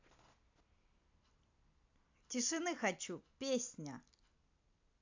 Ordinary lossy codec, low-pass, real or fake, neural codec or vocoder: AAC, 48 kbps; 7.2 kHz; real; none